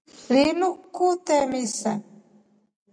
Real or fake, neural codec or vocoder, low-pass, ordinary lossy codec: real; none; 9.9 kHz; MP3, 96 kbps